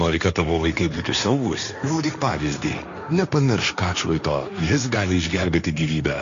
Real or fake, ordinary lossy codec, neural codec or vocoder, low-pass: fake; MP3, 64 kbps; codec, 16 kHz, 1.1 kbps, Voila-Tokenizer; 7.2 kHz